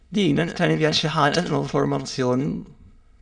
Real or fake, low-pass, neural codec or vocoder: fake; 9.9 kHz; autoencoder, 22.05 kHz, a latent of 192 numbers a frame, VITS, trained on many speakers